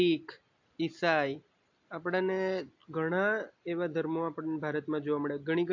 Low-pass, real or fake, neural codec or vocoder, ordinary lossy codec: 7.2 kHz; real; none; AAC, 48 kbps